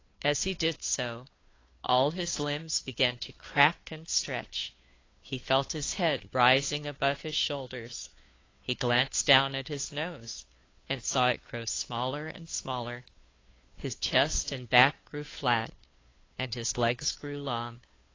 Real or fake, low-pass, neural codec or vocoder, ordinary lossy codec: fake; 7.2 kHz; codec, 16 kHz, 2 kbps, FunCodec, trained on Chinese and English, 25 frames a second; AAC, 32 kbps